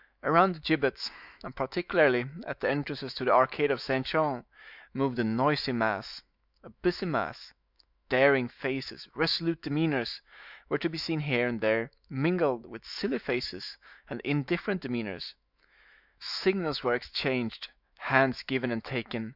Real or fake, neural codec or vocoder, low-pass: fake; autoencoder, 48 kHz, 128 numbers a frame, DAC-VAE, trained on Japanese speech; 5.4 kHz